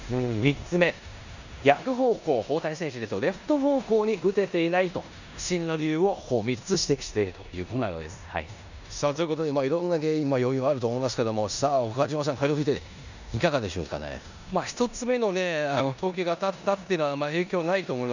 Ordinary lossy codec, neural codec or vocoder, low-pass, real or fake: none; codec, 16 kHz in and 24 kHz out, 0.9 kbps, LongCat-Audio-Codec, four codebook decoder; 7.2 kHz; fake